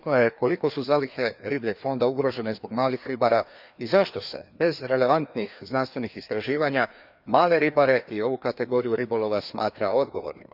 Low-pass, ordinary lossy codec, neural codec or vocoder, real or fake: 5.4 kHz; Opus, 64 kbps; codec, 16 kHz, 2 kbps, FreqCodec, larger model; fake